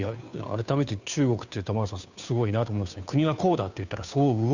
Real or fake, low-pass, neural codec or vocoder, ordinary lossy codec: fake; 7.2 kHz; codec, 16 kHz, 2 kbps, FunCodec, trained on Chinese and English, 25 frames a second; none